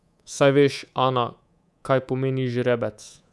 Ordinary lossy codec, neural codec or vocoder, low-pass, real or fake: none; codec, 24 kHz, 3.1 kbps, DualCodec; none; fake